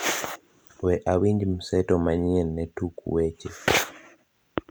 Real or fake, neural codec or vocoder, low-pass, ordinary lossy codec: real; none; none; none